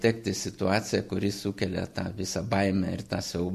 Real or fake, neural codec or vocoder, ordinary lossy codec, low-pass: real; none; MP3, 64 kbps; 14.4 kHz